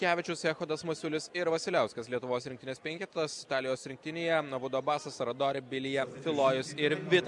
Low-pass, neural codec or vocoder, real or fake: 10.8 kHz; none; real